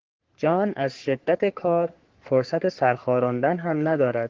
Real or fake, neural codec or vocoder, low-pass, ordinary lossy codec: fake; codec, 44.1 kHz, 3.4 kbps, Pupu-Codec; 7.2 kHz; Opus, 16 kbps